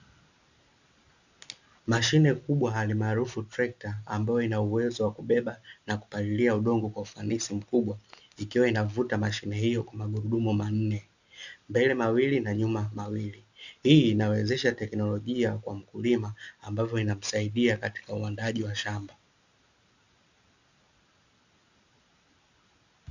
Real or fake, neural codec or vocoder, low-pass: real; none; 7.2 kHz